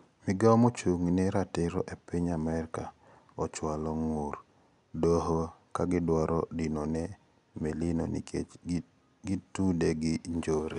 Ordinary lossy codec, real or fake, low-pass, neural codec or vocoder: none; real; 10.8 kHz; none